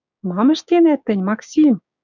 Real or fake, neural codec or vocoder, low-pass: fake; codec, 16 kHz, 6 kbps, DAC; 7.2 kHz